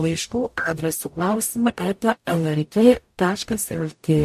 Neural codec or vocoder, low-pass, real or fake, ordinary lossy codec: codec, 44.1 kHz, 0.9 kbps, DAC; 14.4 kHz; fake; MP3, 64 kbps